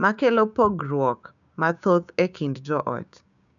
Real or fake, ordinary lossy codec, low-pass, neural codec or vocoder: fake; none; 7.2 kHz; codec, 16 kHz, 6 kbps, DAC